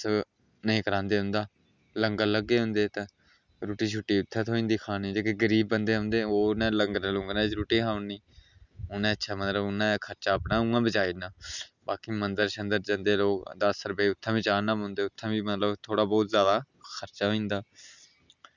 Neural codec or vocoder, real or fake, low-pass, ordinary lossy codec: none; real; 7.2 kHz; none